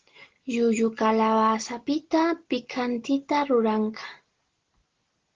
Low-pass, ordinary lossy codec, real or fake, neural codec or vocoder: 7.2 kHz; Opus, 16 kbps; real; none